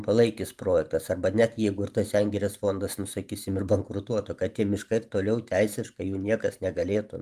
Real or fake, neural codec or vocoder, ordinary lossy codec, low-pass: fake; vocoder, 44.1 kHz, 128 mel bands every 512 samples, BigVGAN v2; Opus, 32 kbps; 14.4 kHz